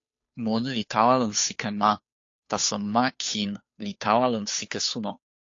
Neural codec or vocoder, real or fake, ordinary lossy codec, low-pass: codec, 16 kHz, 2 kbps, FunCodec, trained on Chinese and English, 25 frames a second; fake; AAC, 48 kbps; 7.2 kHz